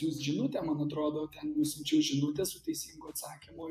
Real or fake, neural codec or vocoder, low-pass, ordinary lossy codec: fake; vocoder, 48 kHz, 128 mel bands, Vocos; 14.4 kHz; AAC, 96 kbps